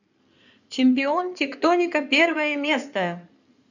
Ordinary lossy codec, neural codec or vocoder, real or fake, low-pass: none; codec, 16 kHz in and 24 kHz out, 2.2 kbps, FireRedTTS-2 codec; fake; 7.2 kHz